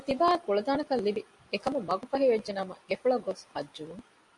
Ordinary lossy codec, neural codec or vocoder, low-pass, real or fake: AAC, 64 kbps; none; 10.8 kHz; real